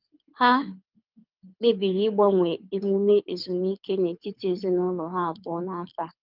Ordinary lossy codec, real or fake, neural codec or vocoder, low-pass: Opus, 24 kbps; fake; codec, 24 kHz, 6 kbps, HILCodec; 5.4 kHz